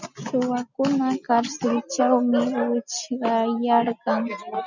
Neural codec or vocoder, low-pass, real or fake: none; 7.2 kHz; real